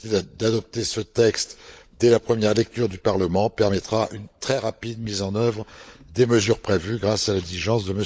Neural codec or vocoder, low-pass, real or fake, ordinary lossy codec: codec, 16 kHz, 16 kbps, FunCodec, trained on LibriTTS, 50 frames a second; none; fake; none